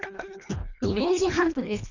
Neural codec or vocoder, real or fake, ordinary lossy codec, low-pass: codec, 24 kHz, 1.5 kbps, HILCodec; fake; none; 7.2 kHz